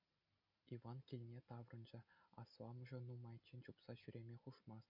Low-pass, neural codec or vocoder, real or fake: 5.4 kHz; none; real